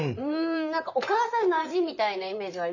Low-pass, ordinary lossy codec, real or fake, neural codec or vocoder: 7.2 kHz; none; fake; vocoder, 44.1 kHz, 128 mel bands, Pupu-Vocoder